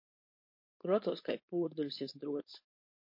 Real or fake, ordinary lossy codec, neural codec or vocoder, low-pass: fake; MP3, 32 kbps; codec, 16 kHz, 4.8 kbps, FACodec; 5.4 kHz